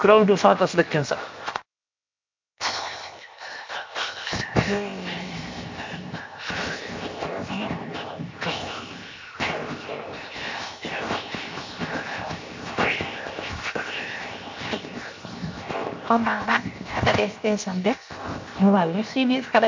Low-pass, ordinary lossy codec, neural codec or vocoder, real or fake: 7.2 kHz; MP3, 48 kbps; codec, 16 kHz, 0.7 kbps, FocalCodec; fake